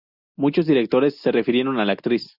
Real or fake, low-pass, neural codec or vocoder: real; 5.4 kHz; none